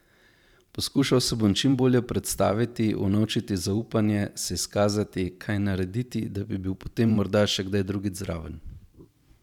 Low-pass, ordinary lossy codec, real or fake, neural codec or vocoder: 19.8 kHz; none; fake; vocoder, 44.1 kHz, 128 mel bands every 512 samples, BigVGAN v2